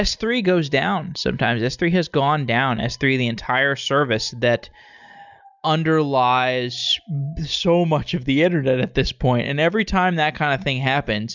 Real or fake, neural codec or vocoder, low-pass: real; none; 7.2 kHz